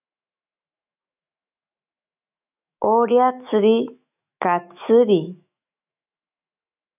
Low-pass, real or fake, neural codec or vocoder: 3.6 kHz; fake; autoencoder, 48 kHz, 128 numbers a frame, DAC-VAE, trained on Japanese speech